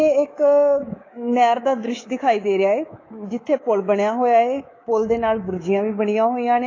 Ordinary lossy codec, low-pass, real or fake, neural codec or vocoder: AAC, 32 kbps; 7.2 kHz; fake; codec, 24 kHz, 3.1 kbps, DualCodec